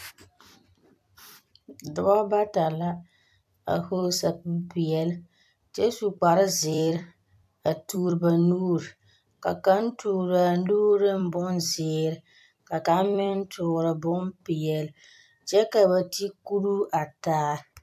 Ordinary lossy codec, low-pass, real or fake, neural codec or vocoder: AAC, 96 kbps; 14.4 kHz; fake; vocoder, 44.1 kHz, 128 mel bands every 256 samples, BigVGAN v2